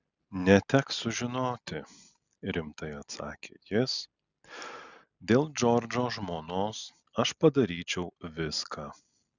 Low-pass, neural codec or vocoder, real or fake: 7.2 kHz; none; real